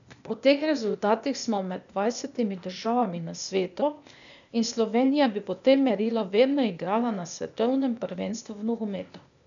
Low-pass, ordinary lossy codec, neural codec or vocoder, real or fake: 7.2 kHz; none; codec, 16 kHz, 0.8 kbps, ZipCodec; fake